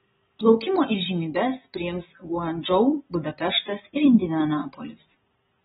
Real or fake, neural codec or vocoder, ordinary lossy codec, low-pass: real; none; AAC, 16 kbps; 19.8 kHz